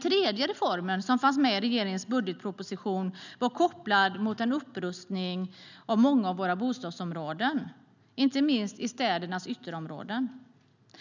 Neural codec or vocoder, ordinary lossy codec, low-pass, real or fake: none; none; 7.2 kHz; real